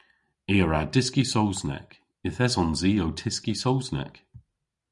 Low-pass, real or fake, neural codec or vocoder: 10.8 kHz; real; none